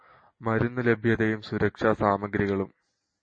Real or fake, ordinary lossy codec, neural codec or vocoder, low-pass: real; MP3, 32 kbps; none; 9.9 kHz